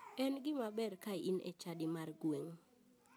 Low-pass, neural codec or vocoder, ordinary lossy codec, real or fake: none; none; none; real